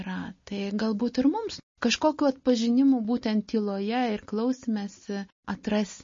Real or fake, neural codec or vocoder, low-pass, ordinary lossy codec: real; none; 7.2 kHz; MP3, 32 kbps